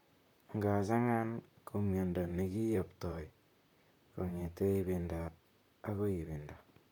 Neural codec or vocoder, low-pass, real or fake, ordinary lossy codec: vocoder, 44.1 kHz, 128 mel bands, Pupu-Vocoder; 19.8 kHz; fake; none